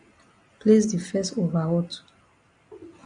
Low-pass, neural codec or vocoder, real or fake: 9.9 kHz; none; real